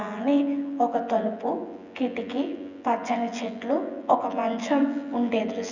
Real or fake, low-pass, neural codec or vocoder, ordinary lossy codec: fake; 7.2 kHz; vocoder, 24 kHz, 100 mel bands, Vocos; none